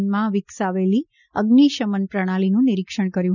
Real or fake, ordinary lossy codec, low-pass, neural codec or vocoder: real; none; 7.2 kHz; none